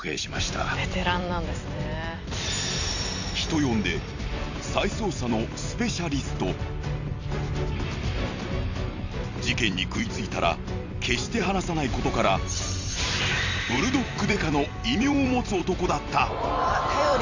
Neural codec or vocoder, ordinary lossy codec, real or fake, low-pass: vocoder, 44.1 kHz, 128 mel bands every 256 samples, BigVGAN v2; Opus, 64 kbps; fake; 7.2 kHz